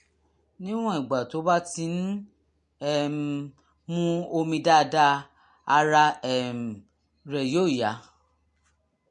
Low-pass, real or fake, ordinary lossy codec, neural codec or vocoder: 10.8 kHz; real; MP3, 48 kbps; none